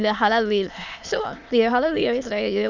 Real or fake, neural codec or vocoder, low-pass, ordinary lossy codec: fake; autoencoder, 22.05 kHz, a latent of 192 numbers a frame, VITS, trained on many speakers; 7.2 kHz; none